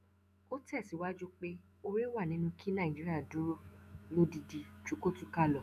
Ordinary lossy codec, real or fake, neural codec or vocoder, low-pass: none; fake; autoencoder, 48 kHz, 128 numbers a frame, DAC-VAE, trained on Japanese speech; 14.4 kHz